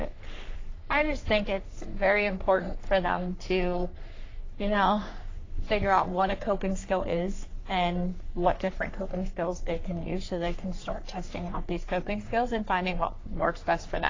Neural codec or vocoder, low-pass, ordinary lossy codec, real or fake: codec, 44.1 kHz, 3.4 kbps, Pupu-Codec; 7.2 kHz; AAC, 32 kbps; fake